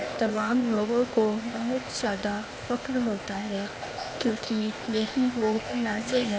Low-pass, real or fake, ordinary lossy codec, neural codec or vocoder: none; fake; none; codec, 16 kHz, 0.8 kbps, ZipCodec